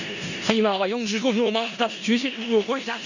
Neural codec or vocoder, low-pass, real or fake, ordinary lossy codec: codec, 16 kHz in and 24 kHz out, 0.4 kbps, LongCat-Audio-Codec, four codebook decoder; 7.2 kHz; fake; none